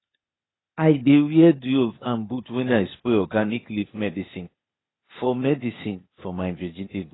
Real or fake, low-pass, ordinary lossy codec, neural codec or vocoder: fake; 7.2 kHz; AAC, 16 kbps; codec, 16 kHz, 0.8 kbps, ZipCodec